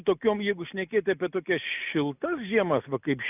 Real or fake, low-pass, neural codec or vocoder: real; 3.6 kHz; none